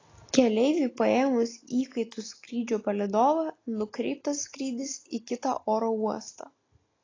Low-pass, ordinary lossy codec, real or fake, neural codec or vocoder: 7.2 kHz; AAC, 32 kbps; real; none